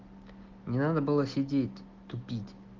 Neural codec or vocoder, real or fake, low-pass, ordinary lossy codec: none; real; 7.2 kHz; Opus, 16 kbps